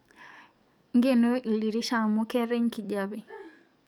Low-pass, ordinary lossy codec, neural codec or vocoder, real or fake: 19.8 kHz; none; codec, 44.1 kHz, 7.8 kbps, DAC; fake